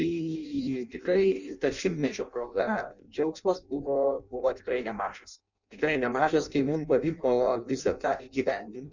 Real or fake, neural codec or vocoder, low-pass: fake; codec, 16 kHz in and 24 kHz out, 0.6 kbps, FireRedTTS-2 codec; 7.2 kHz